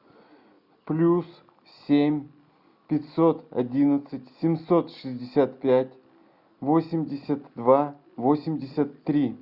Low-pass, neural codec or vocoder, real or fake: 5.4 kHz; none; real